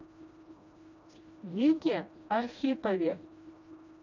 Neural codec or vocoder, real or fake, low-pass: codec, 16 kHz, 1 kbps, FreqCodec, smaller model; fake; 7.2 kHz